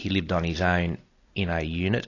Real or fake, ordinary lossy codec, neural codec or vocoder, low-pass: real; AAC, 32 kbps; none; 7.2 kHz